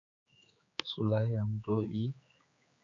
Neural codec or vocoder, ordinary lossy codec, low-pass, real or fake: codec, 16 kHz, 4 kbps, X-Codec, HuBERT features, trained on general audio; MP3, 48 kbps; 7.2 kHz; fake